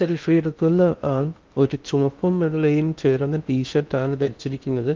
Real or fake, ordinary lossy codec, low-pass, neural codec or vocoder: fake; Opus, 32 kbps; 7.2 kHz; codec, 16 kHz in and 24 kHz out, 0.6 kbps, FocalCodec, streaming, 2048 codes